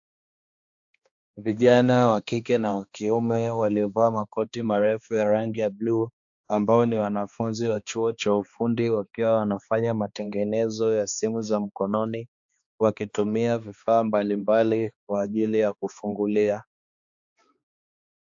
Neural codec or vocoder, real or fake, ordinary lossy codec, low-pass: codec, 16 kHz, 2 kbps, X-Codec, HuBERT features, trained on balanced general audio; fake; AAC, 64 kbps; 7.2 kHz